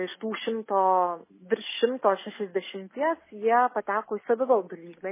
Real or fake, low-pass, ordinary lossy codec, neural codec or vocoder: real; 3.6 kHz; MP3, 16 kbps; none